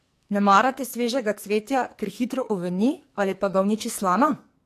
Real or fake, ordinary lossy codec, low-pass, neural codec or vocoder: fake; AAC, 64 kbps; 14.4 kHz; codec, 44.1 kHz, 2.6 kbps, SNAC